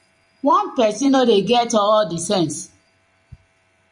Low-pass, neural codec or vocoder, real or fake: 10.8 kHz; vocoder, 44.1 kHz, 128 mel bands every 256 samples, BigVGAN v2; fake